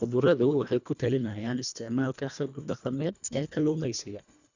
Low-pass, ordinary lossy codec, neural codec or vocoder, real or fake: 7.2 kHz; none; codec, 24 kHz, 1.5 kbps, HILCodec; fake